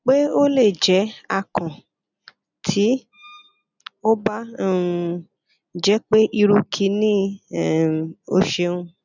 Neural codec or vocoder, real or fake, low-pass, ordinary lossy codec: none; real; 7.2 kHz; none